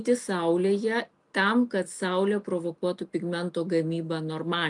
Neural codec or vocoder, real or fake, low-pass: none; real; 10.8 kHz